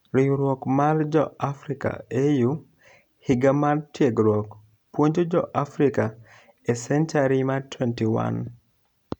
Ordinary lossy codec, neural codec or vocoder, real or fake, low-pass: none; none; real; 19.8 kHz